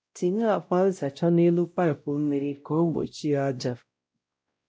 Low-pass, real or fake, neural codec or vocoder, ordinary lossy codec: none; fake; codec, 16 kHz, 0.5 kbps, X-Codec, WavLM features, trained on Multilingual LibriSpeech; none